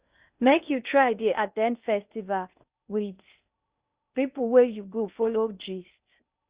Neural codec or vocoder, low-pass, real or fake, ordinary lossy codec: codec, 16 kHz in and 24 kHz out, 0.6 kbps, FocalCodec, streaming, 2048 codes; 3.6 kHz; fake; Opus, 64 kbps